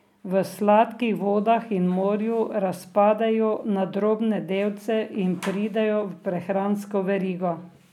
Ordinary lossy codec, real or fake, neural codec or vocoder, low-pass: none; real; none; 19.8 kHz